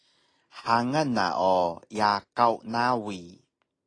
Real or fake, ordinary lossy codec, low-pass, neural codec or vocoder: real; AAC, 32 kbps; 9.9 kHz; none